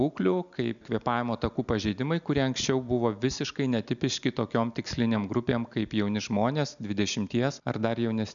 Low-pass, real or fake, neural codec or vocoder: 7.2 kHz; real; none